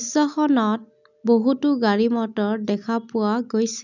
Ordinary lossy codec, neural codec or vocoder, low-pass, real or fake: none; none; 7.2 kHz; real